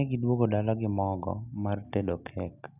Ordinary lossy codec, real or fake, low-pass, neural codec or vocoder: none; real; 3.6 kHz; none